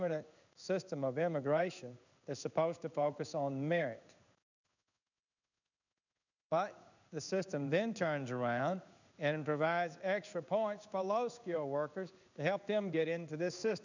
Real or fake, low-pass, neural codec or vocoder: fake; 7.2 kHz; codec, 16 kHz in and 24 kHz out, 1 kbps, XY-Tokenizer